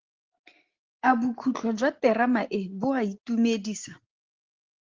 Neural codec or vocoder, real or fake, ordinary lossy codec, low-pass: none; real; Opus, 16 kbps; 7.2 kHz